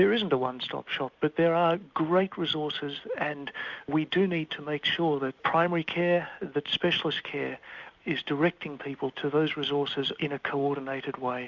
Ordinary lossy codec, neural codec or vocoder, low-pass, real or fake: Opus, 64 kbps; none; 7.2 kHz; real